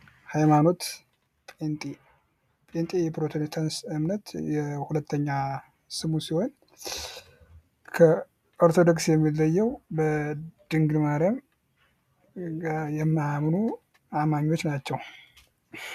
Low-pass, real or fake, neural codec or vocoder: 14.4 kHz; real; none